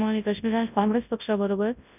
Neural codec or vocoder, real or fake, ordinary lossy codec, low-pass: codec, 24 kHz, 0.9 kbps, WavTokenizer, large speech release; fake; none; 3.6 kHz